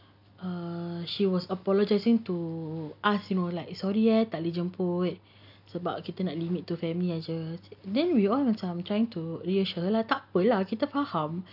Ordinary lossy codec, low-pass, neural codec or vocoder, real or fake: none; 5.4 kHz; none; real